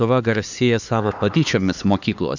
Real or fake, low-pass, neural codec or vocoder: fake; 7.2 kHz; codec, 16 kHz, 4 kbps, X-Codec, HuBERT features, trained on LibriSpeech